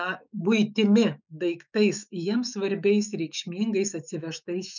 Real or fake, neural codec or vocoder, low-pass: fake; autoencoder, 48 kHz, 128 numbers a frame, DAC-VAE, trained on Japanese speech; 7.2 kHz